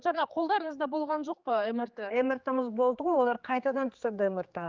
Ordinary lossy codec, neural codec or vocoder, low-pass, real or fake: Opus, 32 kbps; codec, 16 kHz, 4 kbps, X-Codec, HuBERT features, trained on general audio; 7.2 kHz; fake